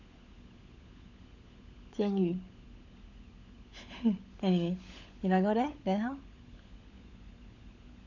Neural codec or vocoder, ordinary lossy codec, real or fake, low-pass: codec, 16 kHz, 16 kbps, FunCodec, trained on LibriTTS, 50 frames a second; none; fake; 7.2 kHz